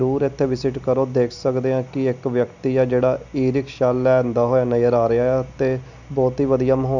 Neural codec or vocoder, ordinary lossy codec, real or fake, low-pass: none; none; real; 7.2 kHz